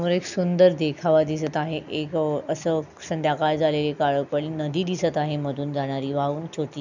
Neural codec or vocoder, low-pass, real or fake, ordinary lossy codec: none; 7.2 kHz; real; none